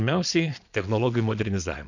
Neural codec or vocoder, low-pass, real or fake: vocoder, 22.05 kHz, 80 mel bands, Vocos; 7.2 kHz; fake